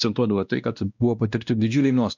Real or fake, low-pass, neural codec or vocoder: fake; 7.2 kHz; codec, 16 kHz, 1 kbps, X-Codec, WavLM features, trained on Multilingual LibriSpeech